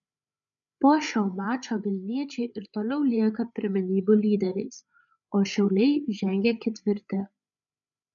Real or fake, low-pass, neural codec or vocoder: fake; 7.2 kHz; codec, 16 kHz, 8 kbps, FreqCodec, larger model